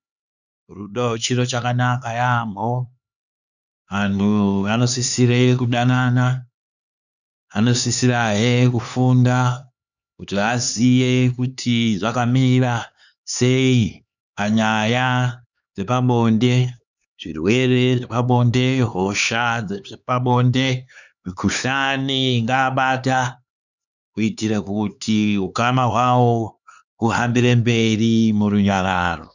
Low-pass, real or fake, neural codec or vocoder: 7.2 kHz; fake; codec, 16 kHz, 4 kbps, X-Codec, HuBERT features, trained on LibriSpeech